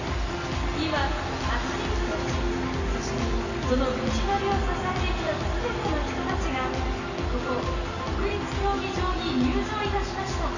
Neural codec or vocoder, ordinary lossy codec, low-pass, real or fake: none; none; 7.2 kHz; real